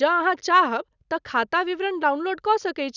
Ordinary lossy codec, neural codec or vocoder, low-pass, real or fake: none; none; 7.2 kHz; real